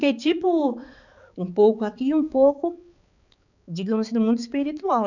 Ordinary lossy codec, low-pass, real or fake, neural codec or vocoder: none; 7.2 kHz; fake; codec, 16 kHz, 4 kbps, X-Codec, HuBERT features, trained on balanced general audio